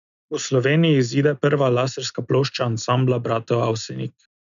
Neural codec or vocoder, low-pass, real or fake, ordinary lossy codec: none; 7.2 kHz; real; none